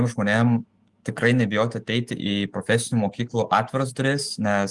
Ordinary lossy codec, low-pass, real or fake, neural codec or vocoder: Opus, 24 kbps; 10.8 kHz; fake; autoencoder, 48 kHz, 128 numbers a frame, DAC-VAE, trained on Japanese speech